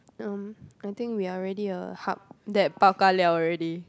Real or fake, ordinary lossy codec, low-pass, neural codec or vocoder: real; none; none; none